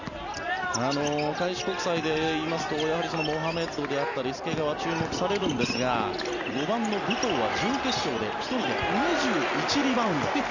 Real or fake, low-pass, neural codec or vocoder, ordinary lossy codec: real; 7.2 kHz; none; none